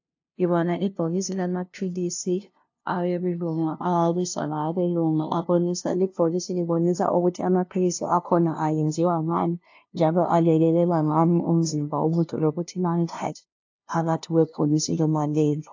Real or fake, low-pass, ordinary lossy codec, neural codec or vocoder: fake; 7.2 kHz; AAC, 48 kbps; codec, 16 kHz, 0.5 kbps, FunCodec, trained on LibriTTS, 25 frames a second